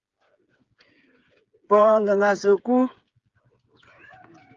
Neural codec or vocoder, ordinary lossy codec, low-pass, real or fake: codec, 16 kHz, 8 kbps, FreqCodec, smaller model; Opus, 32 kbps; 7.2 kHz; fake